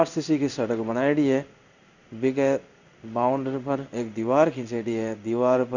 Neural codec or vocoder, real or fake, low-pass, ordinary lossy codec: codec, 16 kHz in and 24 kHz out, 1 kbps, XY-Tokenizer; fake; 7.2 kHz; none